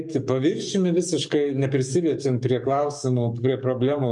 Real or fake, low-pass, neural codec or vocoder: fake; 10.8 kHz; codec, 44.1 kHz, 7.8 kbps, DAC